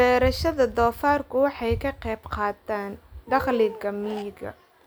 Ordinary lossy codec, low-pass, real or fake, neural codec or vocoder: none; none; real; none